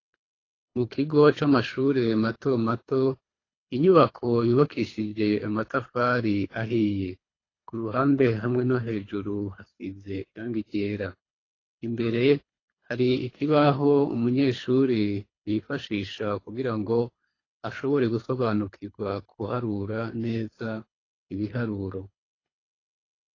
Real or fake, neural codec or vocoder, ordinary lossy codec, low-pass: fake; codec, 24 kHz, 3 kbps, HILCodec; AAC, 32 kbps; 7.2 kHz